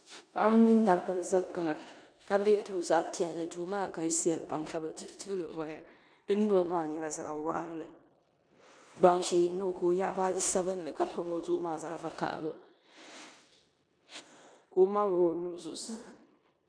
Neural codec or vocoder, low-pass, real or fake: codec, 16 kHz in and 24 kHz out, 0.9 kbps, LongCat-Audio-Codec, four codebook decoder; 9.9 kHz; fake